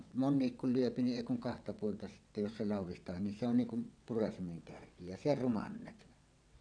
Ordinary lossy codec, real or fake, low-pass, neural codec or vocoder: none; fake; 9.9 kHz; vocoder, 22.05 kHz, 80 mel bands, WaveNeXt